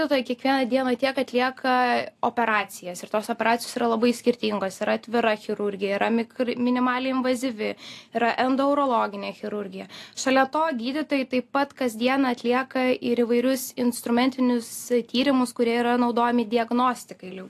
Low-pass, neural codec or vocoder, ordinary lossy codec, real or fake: 14.4 kHz; none; AAC, 64 kbps; real